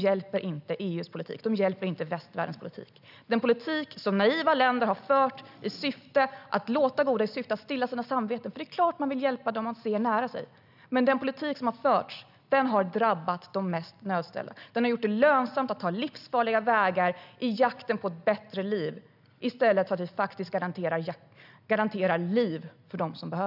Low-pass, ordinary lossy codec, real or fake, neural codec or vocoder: 5.4 kHz; none; real; none